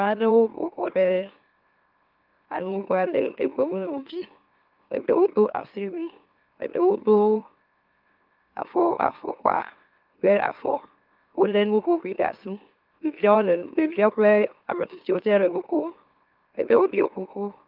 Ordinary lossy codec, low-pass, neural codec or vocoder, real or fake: Opus, 32 kbps; 5.4 kHz; autoencoder, 44.1 kHz, a latent of 192 numbers a frame, MeloTTS; fake